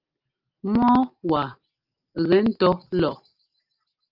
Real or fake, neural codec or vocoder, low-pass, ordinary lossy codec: real; none; 5.4 kHz; Opus, 24 kbps